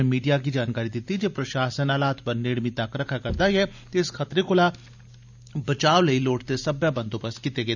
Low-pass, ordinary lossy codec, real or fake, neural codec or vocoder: 7.2 kHz; none; real; none